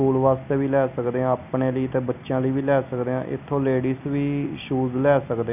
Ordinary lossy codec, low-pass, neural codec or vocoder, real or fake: none; 3.6 kHz; none; real